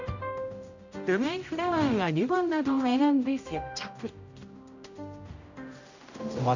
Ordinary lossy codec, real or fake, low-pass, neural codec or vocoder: none; fake; 7.2 kHz; codec, 16 kHz, 0.5 kbps, X-Codec, HuBERT features, trained on general audio